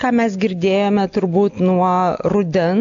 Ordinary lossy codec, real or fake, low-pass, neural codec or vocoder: AAC, 64 kbps; real; 7.2 kHz; none